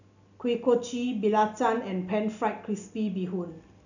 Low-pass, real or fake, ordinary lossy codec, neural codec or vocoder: 7.2 kHz; real; none; none